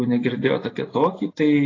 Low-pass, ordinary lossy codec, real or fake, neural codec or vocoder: 7.2 kHz; AAC, 32 kbps; real; none